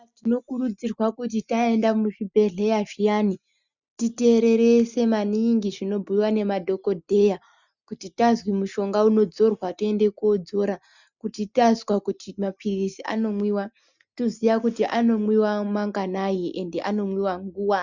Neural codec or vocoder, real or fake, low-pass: none; real; 7.2 kHz